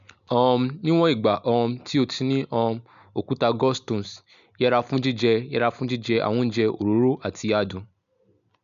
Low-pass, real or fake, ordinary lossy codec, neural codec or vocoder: 7.2 kHz; real; none; none